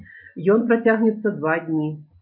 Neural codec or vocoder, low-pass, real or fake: none; 5.4 kHz; real